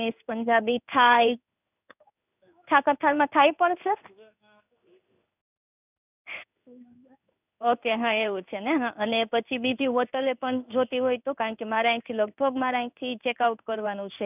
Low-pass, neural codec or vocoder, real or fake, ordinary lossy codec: 3.6 kHz; codec, 16 kHz in and 24 kHz out, 1 kbps, XY-Tokenizer; fake; none